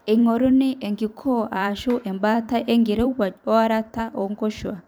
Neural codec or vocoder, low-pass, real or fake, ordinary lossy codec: vocoder, 44.1 kHz, 128 mel bands every 512 samples, BigVGAN v2; none; fake; none